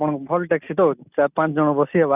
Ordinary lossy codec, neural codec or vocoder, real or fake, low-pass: none; none; real; 3.6 kHz